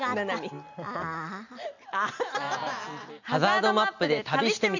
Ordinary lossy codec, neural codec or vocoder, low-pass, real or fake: none; none; 7.2 kHz; real